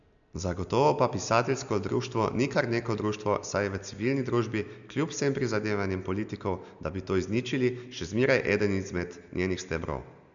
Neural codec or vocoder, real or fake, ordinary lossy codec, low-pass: none; real; none; 7.2 kHz